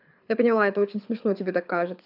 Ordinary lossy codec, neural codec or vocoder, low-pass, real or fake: AAC, 48 kbps; codec, 24 kHz, 6 kbps, HILCodec; 5.4 kHz; fake